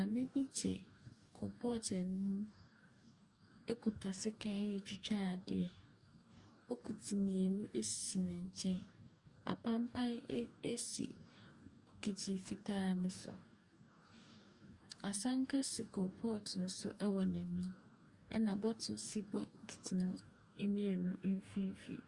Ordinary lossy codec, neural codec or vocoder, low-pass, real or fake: Opus, 64 kbps; codec, 44.1 kHz, 2.6 kbps, DAC; 10.8 kHz; fake